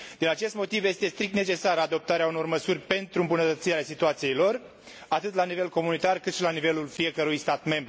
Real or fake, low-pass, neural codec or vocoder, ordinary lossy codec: real; none; none; none